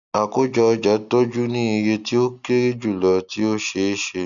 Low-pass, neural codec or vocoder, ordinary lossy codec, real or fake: 7.2 kHz; none; none; real